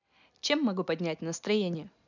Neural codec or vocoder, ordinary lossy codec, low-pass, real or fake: none; none; 7.2 kHz; real